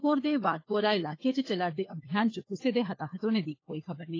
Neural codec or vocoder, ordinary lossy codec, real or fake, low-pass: codec, 16 kHz, 4 kbps, FunCodec, trained on LibriTTS, 50 frames a second; AAC, 32 kbps; fake; 7.2 kHz